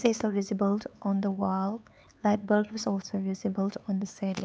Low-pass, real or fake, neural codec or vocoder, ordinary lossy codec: none; fake; codec, 16 kHz, 4 kbps, X-Codec, HuBERT features, trained on LibriSpeech; none